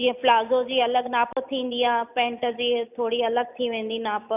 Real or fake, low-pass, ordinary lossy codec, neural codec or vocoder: real; 3.6 kHz; none; none